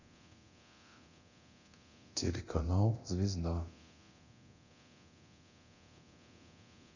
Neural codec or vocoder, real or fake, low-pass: codec, 24 kHz, 0.9 kbps, DualCodec; fake; 7.2 kHz